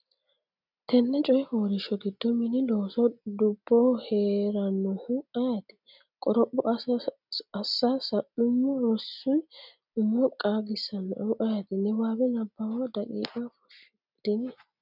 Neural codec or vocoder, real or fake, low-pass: none; real; 5.4 kHz